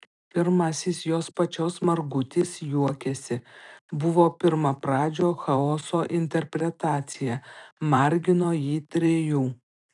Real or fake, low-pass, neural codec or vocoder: fake; 10.8 kHz; vocoder, 44.1 kHz, 128 mel bands every 512 samples, BigVGAN v2